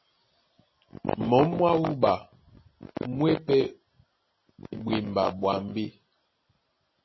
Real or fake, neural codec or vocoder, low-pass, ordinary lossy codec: real; none; 7.2 kHz; MP3, 24 kbps